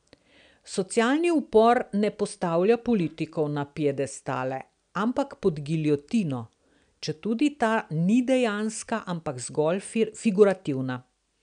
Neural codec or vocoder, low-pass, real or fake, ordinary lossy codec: none; 9.9 kHz; real; none